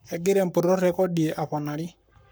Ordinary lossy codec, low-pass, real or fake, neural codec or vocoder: none; none; fake; codec, 44.1 kHz, 7.8 kbps, Pupu-Codec